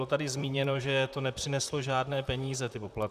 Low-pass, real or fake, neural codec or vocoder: 14.4 kHz; fake; vocoder, 44.1 kHz, 128 mel bands, Pupu-Vocoder